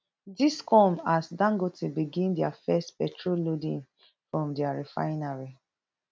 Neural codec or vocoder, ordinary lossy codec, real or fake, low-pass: none; none; real; none